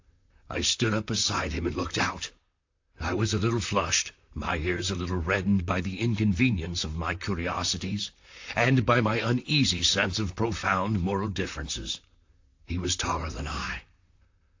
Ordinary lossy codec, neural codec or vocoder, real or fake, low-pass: AAC, 48 kbps; vocoder, 44.1 kHz, 128 mel bands, Pupu-Vocoder; fake; 7.2 kHz